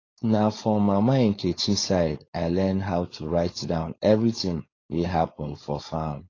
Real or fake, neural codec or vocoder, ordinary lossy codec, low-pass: fake; codec, 16 kHz, 4.8 kbps, FACodec; AAC, 32 kbps; 7.2 kHz